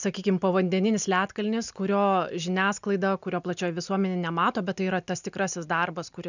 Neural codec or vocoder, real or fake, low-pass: none; real; 7.2 kHz